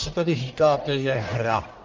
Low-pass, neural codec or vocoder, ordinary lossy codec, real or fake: 7.2 kHz; codec, 44.1 kHz, 1.7 kbps, Pupu-Codec; Opus, 24 kbps; fake